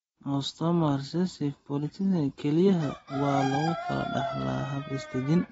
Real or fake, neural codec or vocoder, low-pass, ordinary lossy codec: real; none; 19.8 kHz; AAC, 24 kbps